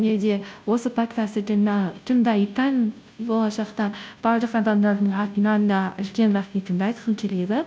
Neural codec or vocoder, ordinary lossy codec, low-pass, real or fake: codec, 16 kHz, 0.5 kbps, FunCodec, trained on Chinese and English, 25 frames a second; none; none; fake